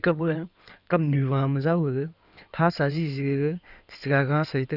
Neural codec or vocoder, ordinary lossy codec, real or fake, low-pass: codec, 16 kHz in and 24 kHz out, 2.2 kbps, FireRedTTS-2 codec; none; fake; 5.4 kHz